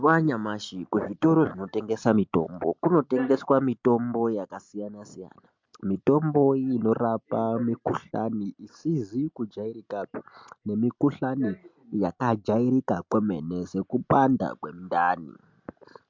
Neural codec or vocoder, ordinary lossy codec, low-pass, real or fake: none; MP3, 64 kbps; 7.2 kHz; real